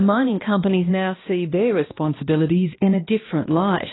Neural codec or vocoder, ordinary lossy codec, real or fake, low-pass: codec, 16 kHz, 1 kbps, X-Codec, HuBERT features, trained on balanced general audio; AAC, 16 kbps; fake; 7.2 kHz